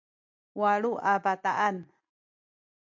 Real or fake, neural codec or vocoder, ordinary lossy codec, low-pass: real; none; MP3, 48 kbps; 7.2 kHz